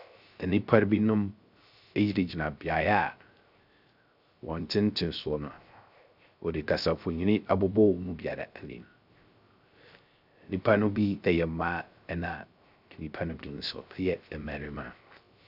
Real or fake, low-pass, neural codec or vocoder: fake; 5.4 kHz; codec, 16 kHz, 0.3 kbps, FocalCodec